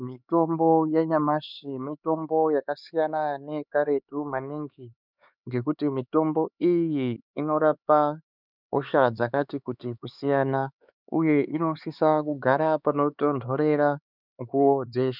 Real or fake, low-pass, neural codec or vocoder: fake; 5.4 kHz; codec, 16 kHz, 4 kbps, X-Codec, HuBERT features, trained on LibriSpeech